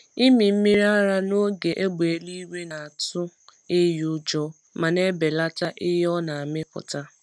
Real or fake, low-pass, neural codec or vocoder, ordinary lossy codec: real; none; none; none